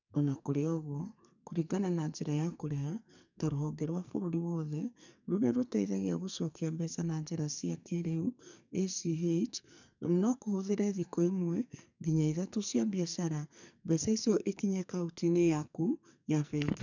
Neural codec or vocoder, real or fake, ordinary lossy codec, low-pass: codec, 44.1 kHz, 2.6 kbps, SNAC; fake; none; 7.2 kHz